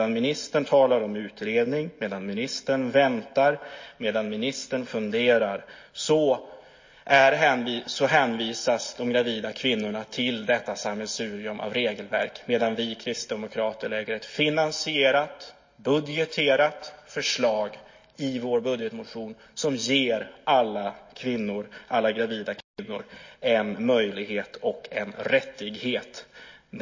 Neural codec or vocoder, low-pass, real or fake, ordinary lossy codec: none; 7.2 kHz; real; MP3, 32 kbps